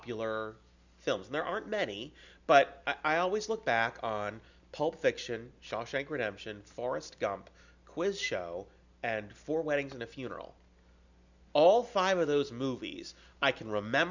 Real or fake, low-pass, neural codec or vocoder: real; 7.2 kHz; none